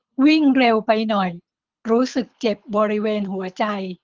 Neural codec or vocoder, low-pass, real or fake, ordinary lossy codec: codec, 24 kHz, 6 kbps, HILCodec; 7.2 kHz; fake; Opus, 32 kbps